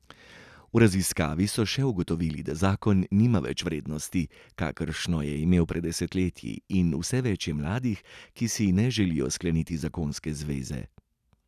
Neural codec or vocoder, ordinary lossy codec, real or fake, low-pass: none; none; real; 14.4 kHz